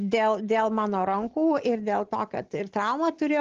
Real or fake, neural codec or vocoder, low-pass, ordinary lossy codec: real; none; 7.2 kHz; Opus, 16 kbps